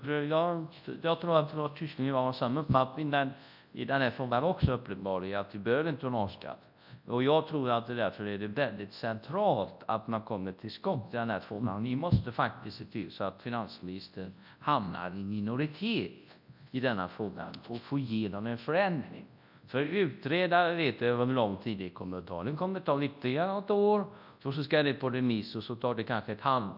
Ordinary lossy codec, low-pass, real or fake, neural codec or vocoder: none; 5.4 kHz; fake; codec, 24 kHz, 0.9 kbps, WavTokenizer, large speech release